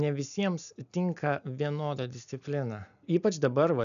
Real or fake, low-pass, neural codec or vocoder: real; 7.2 kHz; none